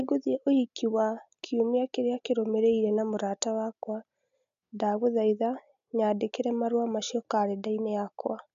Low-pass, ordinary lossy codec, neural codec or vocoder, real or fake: 7.2 kHz; none; none; real